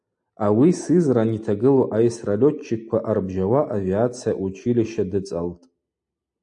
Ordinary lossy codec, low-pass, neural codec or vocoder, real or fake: AAC, 64 kbps; 9.9 kHz; none; real